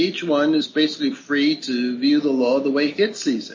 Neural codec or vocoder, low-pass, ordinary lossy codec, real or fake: none; 7.2 kHz; MP3, 32 kbps; real